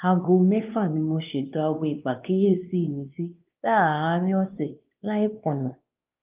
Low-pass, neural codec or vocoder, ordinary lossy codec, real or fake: 3.6 kHz; codec, 16 kHz, 2 kbps, X-Codec, WavLM features, trained on Multilingual LibriSpeech; Opus, 24 kbps; fake